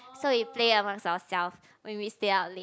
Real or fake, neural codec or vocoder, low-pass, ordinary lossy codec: real; none; none; none